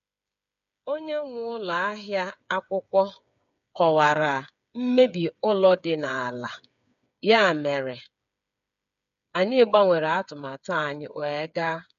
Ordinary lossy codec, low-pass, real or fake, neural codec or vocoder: none; 7.2 kHz; fake; codec, 16 kHz, 8 kbps, FreqCodec, smaller model